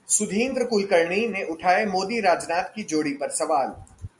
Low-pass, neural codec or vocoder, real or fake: 10.8 kHz; none; real